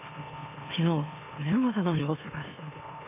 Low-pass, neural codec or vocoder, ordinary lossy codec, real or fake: 3.6 kHz; autoencoder, 44.1 kHz, a latent of 192 numbers a frame, MeloTTS; none; fake